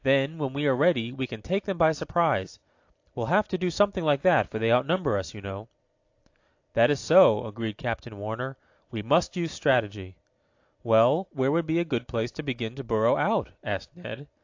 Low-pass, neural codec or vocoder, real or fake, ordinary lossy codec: 7.2 kHz; none; real; AAC, 48 kbps